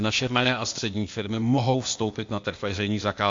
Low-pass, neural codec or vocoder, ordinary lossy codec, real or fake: 7.2 kHz; codec, 16 kHz, 0.8 kbps, ZipCodec; MP3, 48 kbps; fake